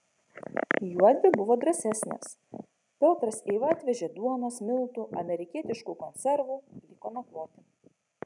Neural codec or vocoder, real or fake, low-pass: none; real; 10.8 kHz